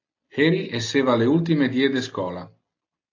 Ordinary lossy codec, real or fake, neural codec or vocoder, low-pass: AAC, 48 kbps; real; none; 7.2 kHz